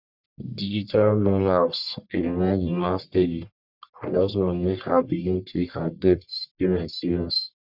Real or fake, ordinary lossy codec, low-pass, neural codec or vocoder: fake; none; 5.4 kHz; codec, 44.1 kHz, 1.7 kbps, Pupu-Codec